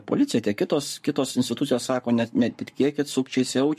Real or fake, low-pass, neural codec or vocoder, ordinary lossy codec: fake; 14.4 kHz; codec, 44.1 kHz, 7.8 kbps, Pupu-Codec; MP3, 64 kbps